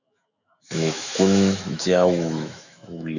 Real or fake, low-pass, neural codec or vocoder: fake; 7.2 kHz; autoencoder, 48 kHz, 128 numbers a frame, DAC-VAE, trained on Japanese speech